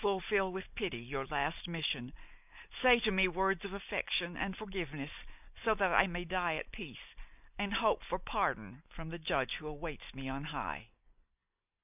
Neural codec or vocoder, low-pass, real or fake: none; 3.6 kHz; real